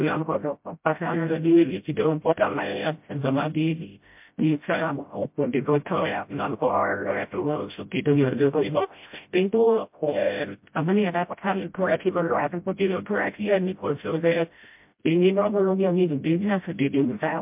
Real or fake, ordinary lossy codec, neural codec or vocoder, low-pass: fake; MP3, 32 kbps; codec, 16 kHz, 0.5 kbps, FreqCodec, smaller model; 3.6 kHz